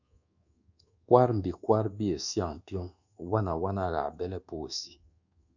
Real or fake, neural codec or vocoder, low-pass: fake; codec, 24 kHz, 1.2 kbps, DualCodec; 7.2 kHz